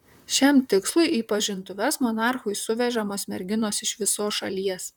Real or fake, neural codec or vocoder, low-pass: fake; vocoder, 44.1 kHz, 128 mel bands, Pupu-Vocoder; 19.8 kHz